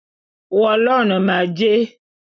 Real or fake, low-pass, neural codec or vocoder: real; 7.2 kHz; none